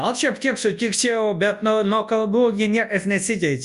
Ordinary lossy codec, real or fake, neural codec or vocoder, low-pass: Opus, 64 kbps; fake; codec, 24 kHz, 0.9 kbps, WavTokenizer, large speech release; 10.8 kHz